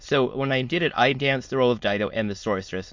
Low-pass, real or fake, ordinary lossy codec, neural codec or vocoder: 7.2 kHz; fake; MP3, 48 kbps; autoencoder, 22.05 kHz, a latent of 192 numbers a frame, VITS, trained on many speakers